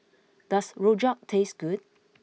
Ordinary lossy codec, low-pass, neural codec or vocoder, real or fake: none; none; none; real